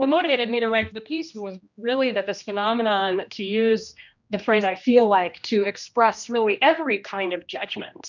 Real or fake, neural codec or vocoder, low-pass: fake; codec, 16 kHz, 1 kbps, X-Codec, HuBERT features, trained on general audio; 7.2 kHz